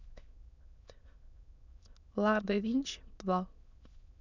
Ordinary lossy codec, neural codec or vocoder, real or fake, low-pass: none; autoencoder, 22.05 kHz, a latent of 192 numbers a frame, VITS, trained on many speakers; fake; 7.2 kHz